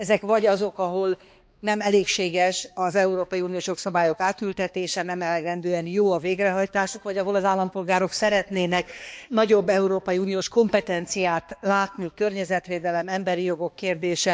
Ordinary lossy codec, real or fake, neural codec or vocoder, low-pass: none; fake; codec, 16 kHz, 2 kbps, X-Codec, HuBERT features, trained on balanced general audio; none